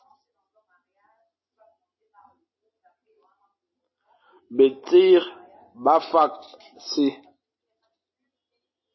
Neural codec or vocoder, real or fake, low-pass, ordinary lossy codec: none; real; 7.2 kHz; MP3, 24 kbps